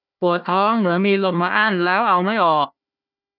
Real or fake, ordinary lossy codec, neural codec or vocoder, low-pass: fake; none; codec, 16 kHz, 1 kbps, FunCodec, trained on Chinese and English, 50 frames a second; 5.4 kHz